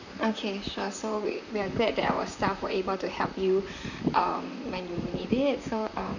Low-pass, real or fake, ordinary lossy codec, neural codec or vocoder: 7.2 kHz; fake; none; vocoder, 44.1 kHz, 128 mel bands, Pupu-Vocoder